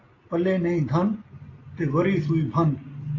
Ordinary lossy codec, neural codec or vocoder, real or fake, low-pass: AAC, 32 kbps; none; real; 7.2 kHz